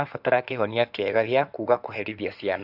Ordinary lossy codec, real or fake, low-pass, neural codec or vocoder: none; fake; 5.4 kHz; codec, 16 kHz in and 24 kHz out, 2.2 kbps, FireRedTTS-2 codec